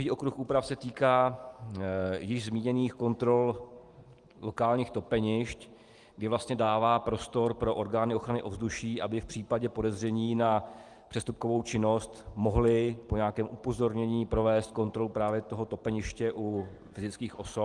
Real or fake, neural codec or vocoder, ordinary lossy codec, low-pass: real; none; Opus, 24 kbps; 10.8 kHz